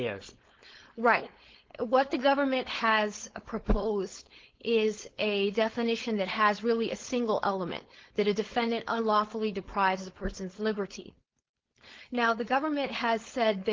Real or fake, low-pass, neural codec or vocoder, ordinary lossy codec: fake; 7.2 kHz; codec, 16 kHz, 4.8 kbps, FACodec; Opus, 16 kbps